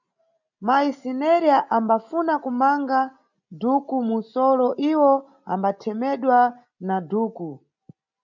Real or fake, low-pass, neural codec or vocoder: real; 7.2 kHz; none